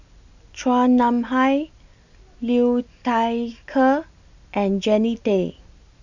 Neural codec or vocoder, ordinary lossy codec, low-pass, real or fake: none; none; 7.2 kHz; real